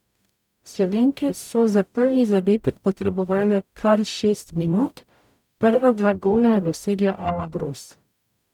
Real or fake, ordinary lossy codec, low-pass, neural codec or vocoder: fake; none; 19.8 kHz; codec, 44.1 kHz, 0.9 kbps, DAC